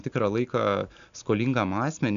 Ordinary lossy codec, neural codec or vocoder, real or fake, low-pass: AAC, 96 kbps; none; real; 7.2 kHz